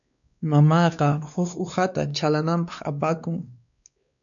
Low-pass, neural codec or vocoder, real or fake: 7.2 kHz; codec, 16 kHz, 2 kbps, X-Codec, WavLM features, trained on Multilingual LibriSpeech; fake